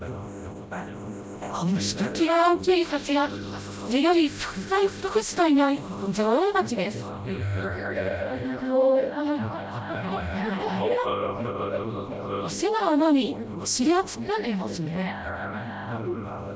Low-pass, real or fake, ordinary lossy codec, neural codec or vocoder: none; fake; none; codec, 16 kHz, 0.5 kbps, FreqCodec, smaller model